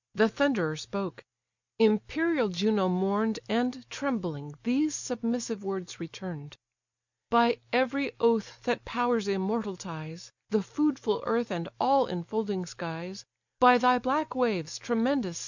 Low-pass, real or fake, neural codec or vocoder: 7.2 kHz; real; none